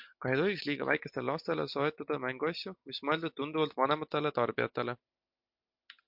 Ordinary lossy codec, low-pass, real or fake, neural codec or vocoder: MP3, 48 kbps; 5.4 kHz; real; none